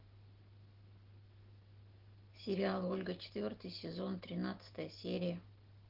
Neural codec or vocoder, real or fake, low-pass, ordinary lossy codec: none; real; 5.4 kHz; Opus, 16 kbps